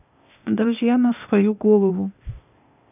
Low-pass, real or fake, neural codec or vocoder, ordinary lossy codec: 3.6 kHz; fake; codec, 16 kHz, 0.8 kbps, ZipCodec; none